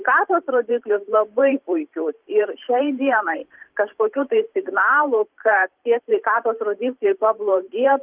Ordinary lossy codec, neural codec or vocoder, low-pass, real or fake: Opus, 32 kbps; vocoder, 44.1 kHz, 128 mel bands every 512 samples, BigVGAN v2; 3.6 kHz; fake